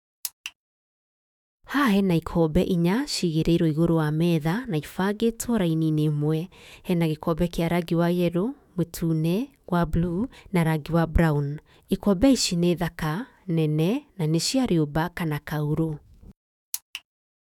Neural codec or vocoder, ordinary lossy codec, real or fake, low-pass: autoencoder, 48 kHz, 128 numbers a frame, DAC-VAE, trained on Japanese speech; none; fake; 19.8 kHz